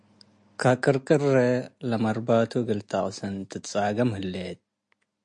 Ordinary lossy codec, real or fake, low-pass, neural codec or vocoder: MP3, 48 kbps; real; 9.9 kHz; none